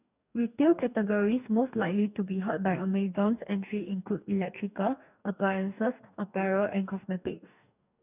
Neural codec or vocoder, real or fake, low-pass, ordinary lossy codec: codec, 44.1 kHz, 2.6 kbps, DAC; fake; 3.6 kHz; none